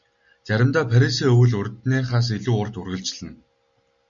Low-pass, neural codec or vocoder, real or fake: 7.2 kHz; none; real